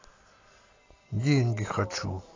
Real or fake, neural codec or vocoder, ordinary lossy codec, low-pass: real; none; AAC, 32 kbps; 7.2 kHz